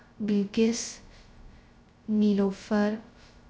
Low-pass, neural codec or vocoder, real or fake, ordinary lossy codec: none; codec, 16 kHz, 0.2 kbps, FocalCodec; fake; none